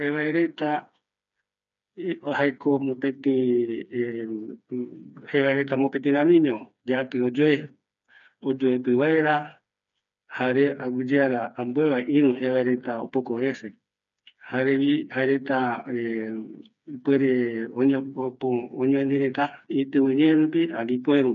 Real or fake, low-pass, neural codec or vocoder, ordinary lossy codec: fake; 7.2 kHz; codec, 16 kHz, 4 kbps, FreqCodec, smaller model; none